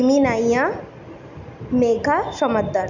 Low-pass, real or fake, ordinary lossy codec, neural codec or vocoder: 7.2 kHz; real; none; none